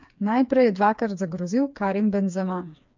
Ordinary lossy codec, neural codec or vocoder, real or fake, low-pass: MP3, 64 kbps; codec, 16 kHz, 4 kbps, FreqCodec, smaller model; fake; 7.2 kHz